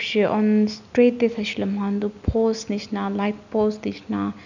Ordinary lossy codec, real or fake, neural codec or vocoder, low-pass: none; real; none; 7.2 kHz